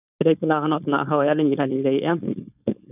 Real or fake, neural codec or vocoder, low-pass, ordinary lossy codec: fake; codec, 16 kHz, 4.8 kbps, FACodec; 3.6 kHz; none